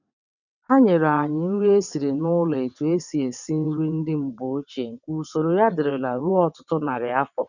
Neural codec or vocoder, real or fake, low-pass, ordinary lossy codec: vocoder, 22.05 kHz, 80 mel bands, WaveNeXt; fake; 7.2 kHz; none